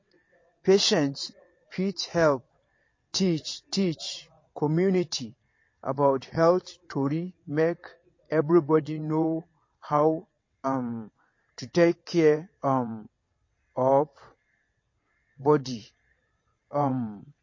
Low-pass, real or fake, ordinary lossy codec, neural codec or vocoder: 7.2 kHz; fake; MP3, 32 kbps; vocoder, 22.05 kHz, 80 mel bands, WaveNeXt